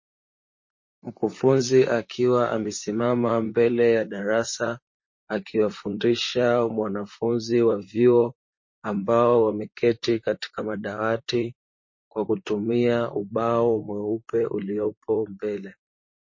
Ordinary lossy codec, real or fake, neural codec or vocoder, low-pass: MP3, 32 kbps; fake; vocoder, 44.1 kHz, 128 mel bands, Pupu-Vocoder; 7.2 kHz